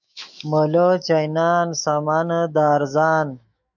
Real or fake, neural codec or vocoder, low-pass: fake; autoencoder, 48 kHz, 128 numbers a frame, DAC-VAE, trained on Japanese speech; 7.2 kHz